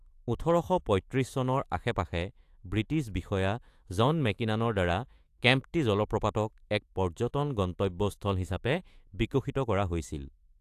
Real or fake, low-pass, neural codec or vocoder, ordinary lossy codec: fake; 14.4 kHz; autoencoder, 48 kHz, 128 numbers a frame, DAC-VAE, trained on Japanese speech; AAC, 64 kbps